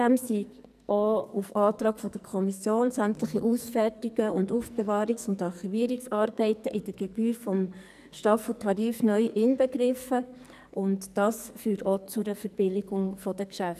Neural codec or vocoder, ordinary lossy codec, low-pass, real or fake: codec, 44.1 kHz, 2.6 kbps, SNAC; none; 14.4 kHz; fake